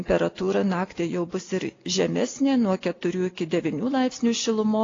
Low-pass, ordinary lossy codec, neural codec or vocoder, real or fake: 7.2 kHz; AAC, 32 kbps; none; real